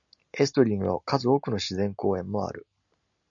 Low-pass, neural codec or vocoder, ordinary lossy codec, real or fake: 7.2 kHz; none; MP3, 96 kbps; real